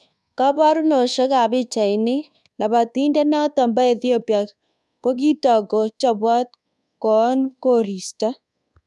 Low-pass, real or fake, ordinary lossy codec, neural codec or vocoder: none; fake; none; codec, 24 kHz, 1.2 kbps, DualCodec